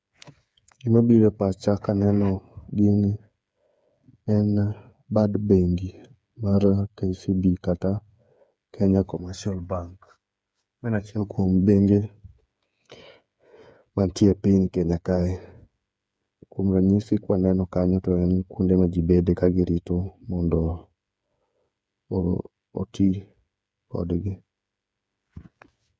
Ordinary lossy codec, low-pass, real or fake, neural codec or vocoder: none; none; fake; codec, 16 kHz, 8 kbps, FreqCodec, smaller model